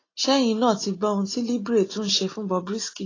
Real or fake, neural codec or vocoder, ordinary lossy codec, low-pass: real; none; AAC, 32 kbps; 7.2 kHz